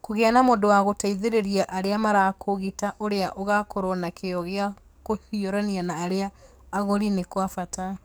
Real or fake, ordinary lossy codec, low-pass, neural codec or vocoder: fake; none; none; codec, 44.1 kHz, 7.8 kbps, DAC